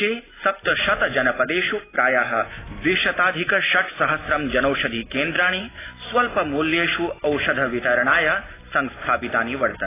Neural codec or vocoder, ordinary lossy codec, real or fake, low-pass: none; AAC, 16 kbps; real; 3.6 kHz